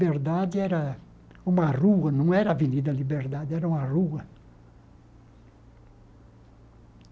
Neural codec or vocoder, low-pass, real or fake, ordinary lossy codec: none; none; real; none